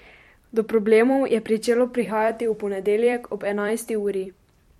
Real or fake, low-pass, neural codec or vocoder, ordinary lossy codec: fake; 19.8 kHz; vocoder, 44.1 kHz, 128 mel bands every 256 samples, BigVGAN v2; MP3, 64 kbps